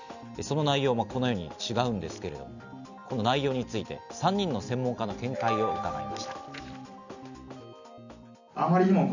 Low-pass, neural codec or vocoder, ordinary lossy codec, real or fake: 7.2 kHz; none; none; real